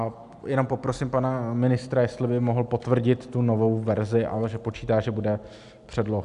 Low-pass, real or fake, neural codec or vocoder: 10.8 kHz; real; none